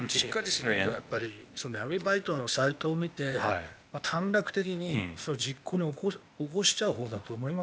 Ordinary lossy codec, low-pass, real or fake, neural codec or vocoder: none; none; fake; codec, 16 kHz, 0.8 kbps, ZipCodec